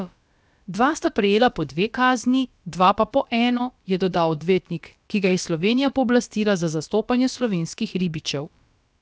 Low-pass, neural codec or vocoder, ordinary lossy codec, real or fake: none; codec, 16 kHz, about 1 kbps, DyCAST, with the encoder's durations; none; fake